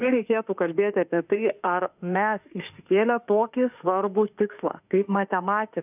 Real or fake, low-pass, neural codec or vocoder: fake; 3.6 kHz; autoencoder, 48 kHz, 32 numbers a frame, DAC-VAE, trained on Japanese speech